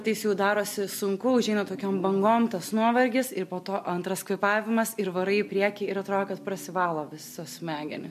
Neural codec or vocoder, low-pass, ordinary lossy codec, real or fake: none; 14.4 kHz; MP3, 64 kbps; real